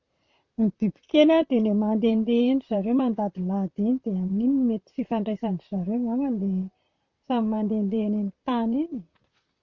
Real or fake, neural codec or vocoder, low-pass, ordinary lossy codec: fake; vocoder, 44.1 kHz, 128 mel bands, Pupu-Vocoder; 7.2 kHz; Opus, 64 kbps